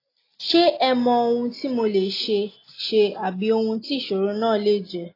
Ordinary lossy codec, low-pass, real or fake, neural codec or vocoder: AAC, 24 kbps; 5.4 kHz; real; none